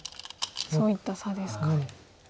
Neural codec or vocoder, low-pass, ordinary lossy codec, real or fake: none; none; none; real